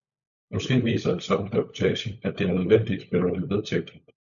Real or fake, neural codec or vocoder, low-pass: fake; codec, 16 kHz, 16 kbps, FunCodec, trained on LibriTTS, 50 frames a second; 7.2 kHz